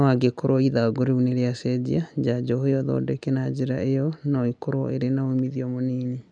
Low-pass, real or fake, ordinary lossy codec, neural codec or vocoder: 9.9 kHz; real; none; none